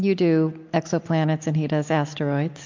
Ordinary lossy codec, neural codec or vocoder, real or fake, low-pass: MP3, 48 kbps; none; real; 7.2 kHz